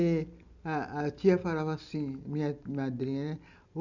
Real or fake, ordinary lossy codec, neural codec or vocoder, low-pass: real; none; none; 7.2 kHz